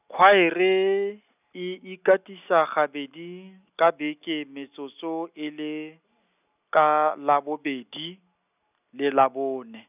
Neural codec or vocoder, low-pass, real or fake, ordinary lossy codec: none; 3.6 kHz; real; none